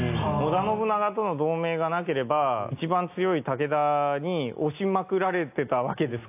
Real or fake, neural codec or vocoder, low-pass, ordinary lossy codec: real; none; 3.6 kHz; AAC, 32 kbps